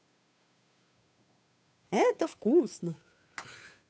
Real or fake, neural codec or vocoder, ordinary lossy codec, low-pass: fake; codec, 16 kHz, 2 kbps, FunCodec, trained on Chinese and English, 25 frames a second; none; none